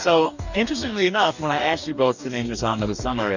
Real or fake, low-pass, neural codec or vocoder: fake; 7.2 kHz; codec, 44.1 kHz, 2.6 kbps, DAC